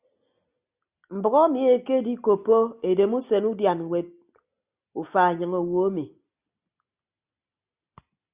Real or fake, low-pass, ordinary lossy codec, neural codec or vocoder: real; 3.6 kHz; Opus, 64 kbps; none